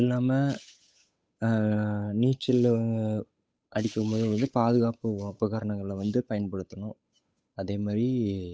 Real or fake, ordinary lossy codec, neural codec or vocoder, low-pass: fake; none; codec, 16 kHz, 8 kbps, FunCodec, trained on Chinese and English, 25 frames a second; none